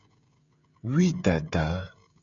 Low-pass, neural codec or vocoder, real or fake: 7.2 kHz; codec, 16 kHz, 16 kbps, FreqCodec, smaller model; fake